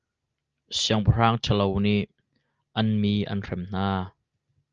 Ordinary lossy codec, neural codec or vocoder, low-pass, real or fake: Opus, 24 kbps; none; 7.2 kHz; real